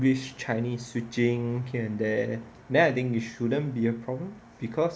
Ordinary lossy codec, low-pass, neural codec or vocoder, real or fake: none; none; none; real